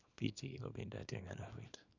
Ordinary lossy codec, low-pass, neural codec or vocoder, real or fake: none; 7.2 kHz; codec, 24 kHz, 0.9 kbps, WavTokenizer, small release; fake